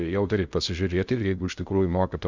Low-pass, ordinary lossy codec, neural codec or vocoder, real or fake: 7.2 kHz; Opus, 64 kbps; codec, 16 kHz in and 24 kHz out, 0.6 kbps, FocalCodec, streaming, 2048 codes; fake